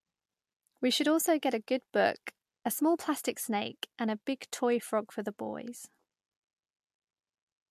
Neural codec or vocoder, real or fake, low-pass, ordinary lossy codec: none; real; 14.4 kHz; MP3, 64 kbps